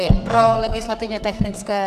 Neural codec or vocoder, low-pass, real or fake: codec, 44.1 kHz, 2.6 kbps, SNAC; 14.4 kHz; fake